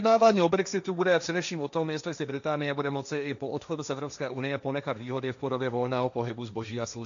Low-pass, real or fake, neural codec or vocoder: 7.2 kHz; fake; codec, 16 kHz, 1.1 kbps, Voila-Tokenizer